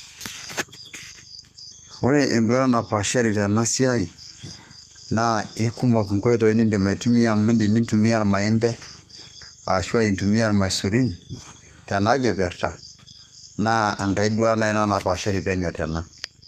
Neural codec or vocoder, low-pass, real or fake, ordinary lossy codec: codec, 32 kHz, 1.9 kbps, SNAC; 14.4 kHz; fake; none